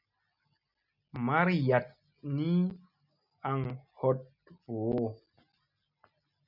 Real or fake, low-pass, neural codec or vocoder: real; 5.4 kHz; none